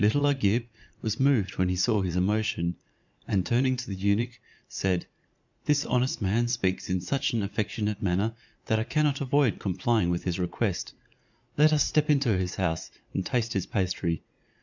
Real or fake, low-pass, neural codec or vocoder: fake; 7.2 kHz; vocoder, 44.1 kHz, 80 mel bands, Vocos